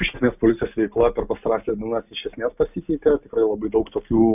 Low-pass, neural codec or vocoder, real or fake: 3.6 kHz; none; real